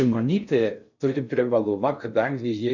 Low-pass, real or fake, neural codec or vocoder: 7.2 kHz; fake; codec, 16 kHz in and 24 kHz out, 0.6 kbps, FocalCodec, streaming, 4096 codes